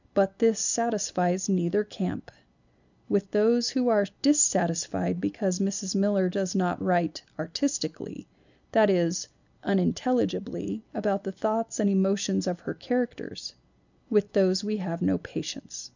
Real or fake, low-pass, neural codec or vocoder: real; 7.2 kHz; none